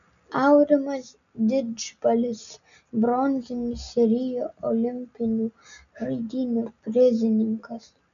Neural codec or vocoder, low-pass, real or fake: none; 7.2 kHz; real